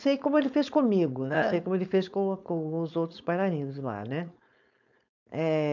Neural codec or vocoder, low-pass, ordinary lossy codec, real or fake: codec, 16 kHz, 4.8 kbps, FACodec; 7.2 kHz; none; fake